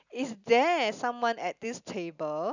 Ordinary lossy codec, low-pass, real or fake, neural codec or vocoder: none; 7.2 kHz; real; none